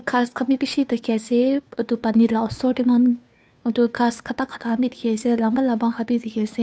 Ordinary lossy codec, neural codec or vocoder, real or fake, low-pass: none; codec, 16 kHz, 2 kbps, FunCodec, trained on Chinese and English, 25 frames a second; fake; none